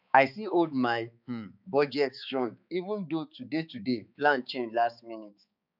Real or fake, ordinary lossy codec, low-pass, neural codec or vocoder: fake; none; 5.4 kHz; codec, 16 kHz, 4 kbps, X-Codec, HuBERT features, trained on balanced general audio